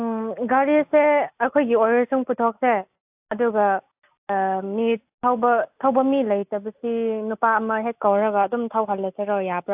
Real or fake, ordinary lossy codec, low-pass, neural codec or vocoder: real; AAC, 32 kbps; 3.6 kHz; none